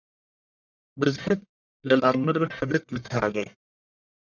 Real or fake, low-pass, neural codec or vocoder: fake; 7.2 kHz; codec, 44.1 kHz, 1.7 kbps, Pupu-Codec